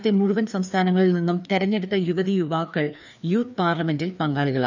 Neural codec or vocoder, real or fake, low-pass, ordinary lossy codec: codec, 16 kHz, 2 kbps, FreqCodec, larger model; fake; 7.2 kHz; none